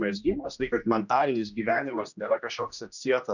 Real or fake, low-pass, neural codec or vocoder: fake; 7.2 kHz; codec, 16 kHz, 1 kbps, X-Codec, HuBERT features, trained on general audio